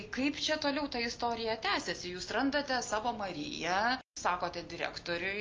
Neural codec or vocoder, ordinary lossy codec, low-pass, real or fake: none; Opus, 24 kbps; 7.2 kHz; real